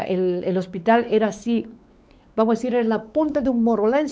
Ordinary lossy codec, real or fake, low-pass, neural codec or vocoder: none; fake; none; codec, 16 kHz, 4 kbps, X-Codec, WavLM features, trained on Multilingual LibriSpeech